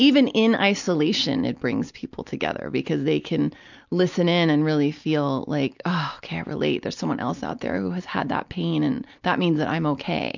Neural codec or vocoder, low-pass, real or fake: none; 7.2 kHz; real